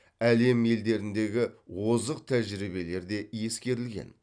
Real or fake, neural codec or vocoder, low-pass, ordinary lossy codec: real; none; 9.9 kHz; none